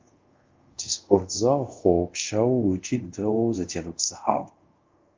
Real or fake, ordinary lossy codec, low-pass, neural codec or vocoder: fake; Opus, 32 kbps; 7.2 kHz; codec, 24 kHz, 0.5 kbps, DualCodec